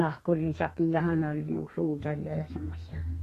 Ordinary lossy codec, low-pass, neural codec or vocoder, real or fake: AAC, 48 kbps; 14.4 kHz; codec, 32 kHz, 1.9 kbps, SNAC; fake